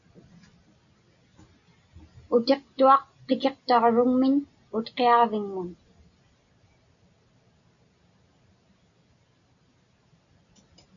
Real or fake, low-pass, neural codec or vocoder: real; 7.2 kHz; none